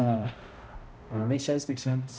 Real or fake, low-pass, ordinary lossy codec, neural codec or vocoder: fake; none; none; codec, 16 kHz, 0.5 kbps, X-Codec, HuBERT features, trained on balanced general audio